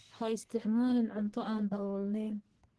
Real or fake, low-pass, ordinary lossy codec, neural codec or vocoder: fake; 10.8 kHz; Opus, 16 kbps; codec, 44.1 kHz, 1.7 kbps, Pupu-Codec